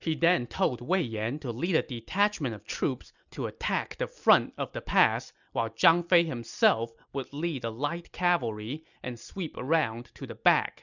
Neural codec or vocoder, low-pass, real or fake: none; 7.2 kHz; real